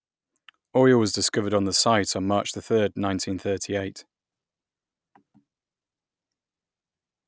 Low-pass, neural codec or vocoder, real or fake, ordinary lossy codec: none; none; real; none